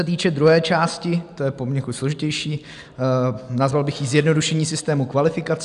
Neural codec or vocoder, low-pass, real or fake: none; 10.8 kHz; real